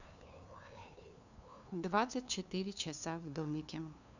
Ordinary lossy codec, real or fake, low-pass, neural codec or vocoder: none; fake; 7.2 kHz; codec, 16 kHz, 2 kbps, FunCodec, trained on LibriTTS, 25 frames a second